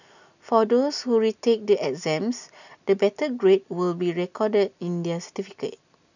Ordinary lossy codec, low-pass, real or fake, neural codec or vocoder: none; 7.2 kHz; real; none